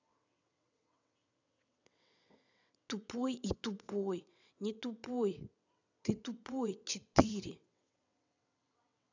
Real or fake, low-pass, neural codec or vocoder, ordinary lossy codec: fake; 7.2 kHz; vocoder, 22.05 kHz, 80 mel bands, WaveNeXt; none